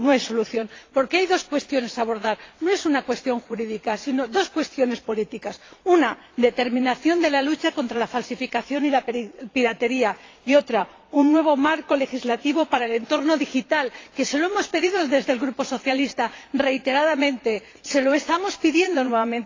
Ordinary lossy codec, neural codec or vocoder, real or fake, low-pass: AAC, 32 kbps; vocoder, 22.05 kHz, 80 mel bands, Vocos; fake; 7.2 kHz